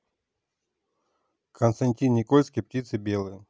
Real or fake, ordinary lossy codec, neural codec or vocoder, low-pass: real; none; none; none